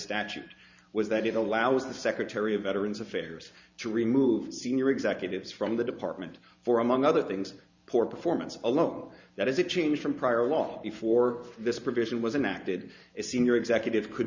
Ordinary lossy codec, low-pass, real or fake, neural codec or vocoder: Opus, 64 kbps; 7.2 kHz; fake; vocoder, 44.1 kHz, 128 mel bands every 512 samples, BigVGAN v2